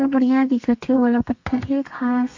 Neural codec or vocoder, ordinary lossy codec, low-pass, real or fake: codec, 32 kHz, 1.9 kbps, SNAC; MP3, 48 kbps; 7.2 kHz; fake